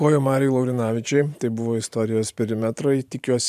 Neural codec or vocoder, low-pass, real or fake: vocoder, 44.1 kHz, 128 mel bands every 512 samples, BigVGAN v2; 14.4 kHz; fake